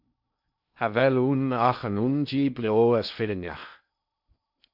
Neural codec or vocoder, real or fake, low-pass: codec, 16 kHz in and 24 kHz out, 0.6 kbps, FocalCodec, streaming, 2048 codes; fake; 5.4 kHz